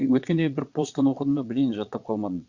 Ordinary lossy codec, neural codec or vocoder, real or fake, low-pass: Opus, 64 kbps; vocoder, 22.05 kHz, 80 mel bands, Vocos; fake; 7.2 kHz